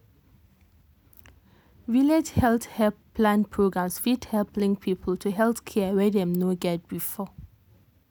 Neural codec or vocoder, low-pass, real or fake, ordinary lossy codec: none; none; real; none